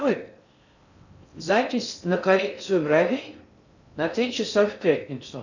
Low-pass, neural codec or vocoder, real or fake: 7.2 kHz; codec, 16 kHz in and 24 kHz out, 0.6 kbps, FocalCodec, streaming, 4096 codes; fake